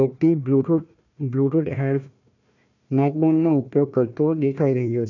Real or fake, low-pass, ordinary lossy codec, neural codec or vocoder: fake; 7.2 kHz; none; codec, 16 kHz, 1 kbps, FunCodec, trained on Chinese and English, 50 frames a second